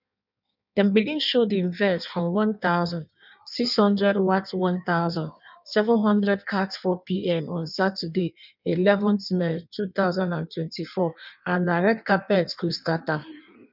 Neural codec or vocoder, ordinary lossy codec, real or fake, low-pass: codec, 16 kHz in and 24 kHz out, 1.1 kbps, FireRedTTS-2 codec; none; fake; 5.4 kHz